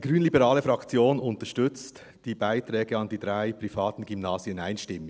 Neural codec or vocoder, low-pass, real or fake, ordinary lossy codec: none; none; real; none